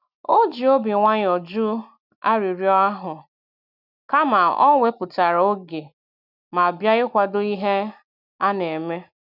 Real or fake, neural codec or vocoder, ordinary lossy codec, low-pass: real; none; none; 5.4 kHz